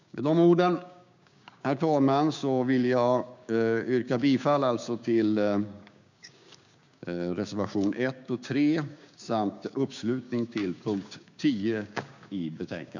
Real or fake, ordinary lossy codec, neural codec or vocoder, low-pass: fake; none; codec, 16 kHz, 6 kbps, DAC; 7.2 kHz